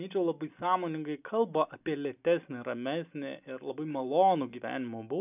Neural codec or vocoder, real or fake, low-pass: none; real; 3.6 kHz